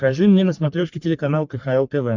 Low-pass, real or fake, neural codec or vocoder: 7.2 kHz; fake; codec, 44.1 kHz, 3.4 kbps, Pupu-Codec